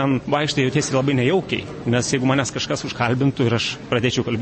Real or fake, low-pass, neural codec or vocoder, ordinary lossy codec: real; 9.9 kHz; none; MP3, 32 kbps